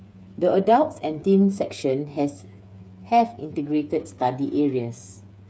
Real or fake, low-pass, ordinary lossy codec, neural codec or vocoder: fake; none; none; codec, 16 kHz, 8 kbps, FreqCodec, smaller model